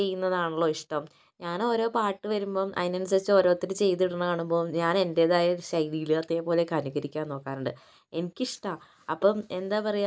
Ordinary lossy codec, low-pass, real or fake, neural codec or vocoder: none; none; real; none